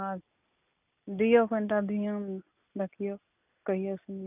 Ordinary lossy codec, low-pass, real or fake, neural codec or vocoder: none; 3.6 kHz; real; none